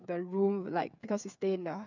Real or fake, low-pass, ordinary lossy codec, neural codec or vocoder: fake; 7.2 kHz; none; codec, 16 kHz, 8 kbps, FreqCodec, smaller model